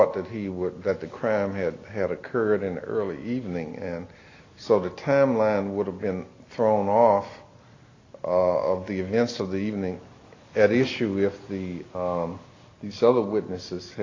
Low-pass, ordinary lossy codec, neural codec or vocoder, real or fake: 7.2 kHz; AAC, 32 kbps; none; real